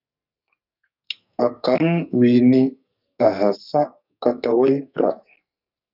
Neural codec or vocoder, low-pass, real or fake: codec, 44.1 kHz, 2.6 kbps, SNAC; 5.4 kHz; fake